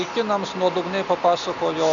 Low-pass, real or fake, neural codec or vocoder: 7.2 kHz; real; none